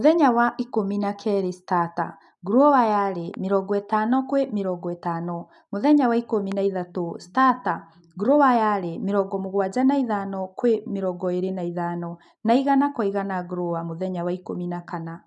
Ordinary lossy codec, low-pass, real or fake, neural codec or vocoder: none; 10.8 kHz; real; none